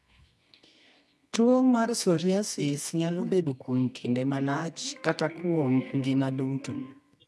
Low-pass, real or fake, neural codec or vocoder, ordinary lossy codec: none; fake; codec, 24 kHz, 0.9 kbps, WavTokenizer, medium music audio release; none